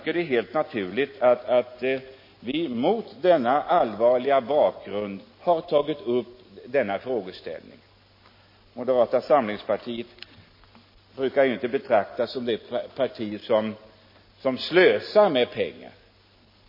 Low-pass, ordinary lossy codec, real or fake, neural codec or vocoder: 5.4 kHz; MP3, 24 kbps; real; none